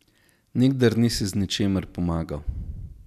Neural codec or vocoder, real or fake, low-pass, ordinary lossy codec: none; real; 14.4 kHz; none